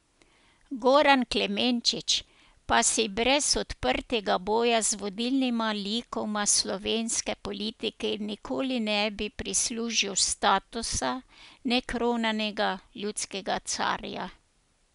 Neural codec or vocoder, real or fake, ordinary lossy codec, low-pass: none; real; none; 10.8 kHz